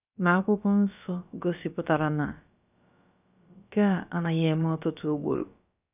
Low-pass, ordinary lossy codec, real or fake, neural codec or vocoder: 3.6 kHz; none; fake; codec, 16 kHz, about 1 kbps, DyCAST, with the encoder's durations